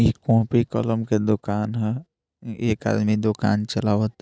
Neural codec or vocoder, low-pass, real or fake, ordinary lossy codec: none; none; real; none